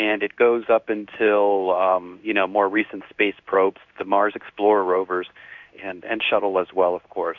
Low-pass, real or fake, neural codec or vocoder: 7.2 kHz; fake; codec, 16 kHz in and 24 kHz out, 1 kbps, XY-Tokenizer